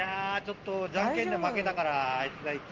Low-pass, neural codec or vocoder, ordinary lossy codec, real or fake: 7.2 kHz; none; Opus, 16 kbps; real